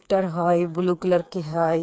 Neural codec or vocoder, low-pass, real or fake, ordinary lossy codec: codec, 16 kHz, 4 kbps, FreqCodec, smaller model; none; fake; none